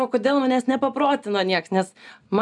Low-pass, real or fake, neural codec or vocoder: 10.8 kHz; real; none